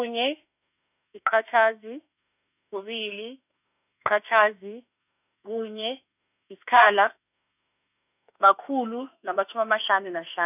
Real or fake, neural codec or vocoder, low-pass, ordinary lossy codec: fake; autoencoder, 48 kHz, 32 numbers a frame, DAC-VAE, trained on Japanese speech; 3.6 kHz; none